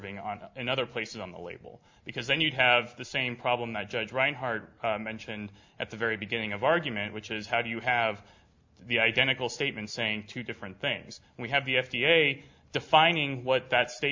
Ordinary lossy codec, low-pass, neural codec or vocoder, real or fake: MP3, 48 kbps; 7.2 kHz; none; real